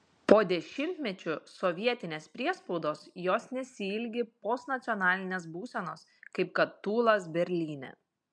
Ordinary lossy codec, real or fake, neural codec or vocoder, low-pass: MP3, 64 kbps; real; none; 9.9 kHz